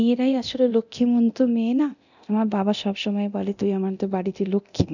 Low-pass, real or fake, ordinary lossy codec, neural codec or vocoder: 7.2 kHz; fake; none; codec, 24 kHz, 0.9 kbps, DualCodec